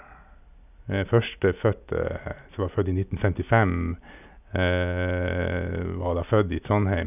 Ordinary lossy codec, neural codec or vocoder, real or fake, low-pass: none; none; real; 3.6 kHz